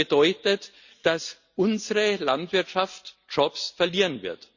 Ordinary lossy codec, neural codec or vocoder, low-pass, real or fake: Opus, 64 kbps; none; 7.2 kHz; real